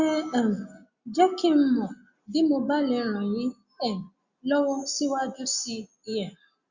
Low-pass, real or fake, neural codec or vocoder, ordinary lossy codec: 7.2 kHz; real; none; Opus, 64 kbps